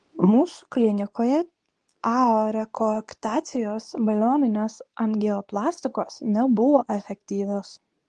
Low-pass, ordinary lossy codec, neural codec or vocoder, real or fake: 10.8 kHz; Opus, 24 kbps; codec, 24 kHz, 0.9 kbps, WavTokenizer, medium speech release version 2; fake